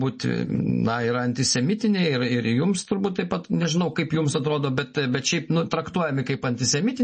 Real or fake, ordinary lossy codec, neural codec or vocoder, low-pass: real; MP3, 32 kbps; none; 10.8 kHz